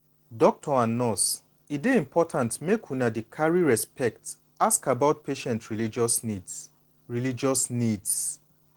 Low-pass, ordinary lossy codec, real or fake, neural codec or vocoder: 19.8 kHz; Opus, 16 kbps; real; none